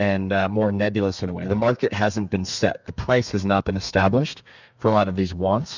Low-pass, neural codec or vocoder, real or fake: 7.2 kHz; codec, 32 kHz, 1.9 kbps, SNAC; fake